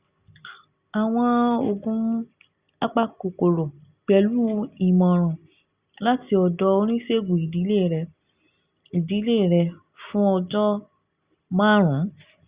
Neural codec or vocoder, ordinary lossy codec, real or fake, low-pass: none; Opus, 64 kbps; real; 3.6 kHz